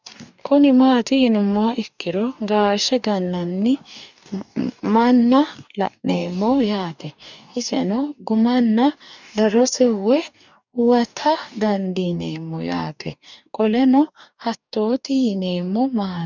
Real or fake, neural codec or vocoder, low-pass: fake; codec, 44.1 kHz, 2.6 kbps, DAC; 7.2 kHz